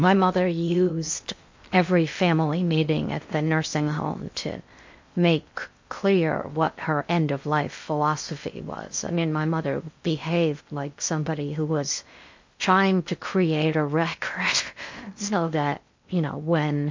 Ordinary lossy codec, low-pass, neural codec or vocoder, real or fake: MP3, 48 kbps; 7.2 kHz; codec, 16 kHz in and 24 kHz out, 0.6 kbps, FocalCodec, streaming, 2048 codes; fake